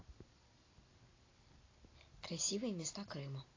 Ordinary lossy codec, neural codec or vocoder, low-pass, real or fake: AAC, 32 kbps; none; 7.2 kHz; real